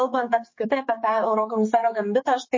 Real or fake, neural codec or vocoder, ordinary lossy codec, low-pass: fake; codec, 16 kHz, 4 kbps, X-Codec, HuBERT features, trained on general audio; MP3, 32 kbps; 7.2 kHz